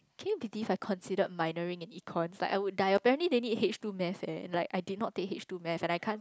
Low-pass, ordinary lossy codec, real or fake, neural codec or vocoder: none; none; real; none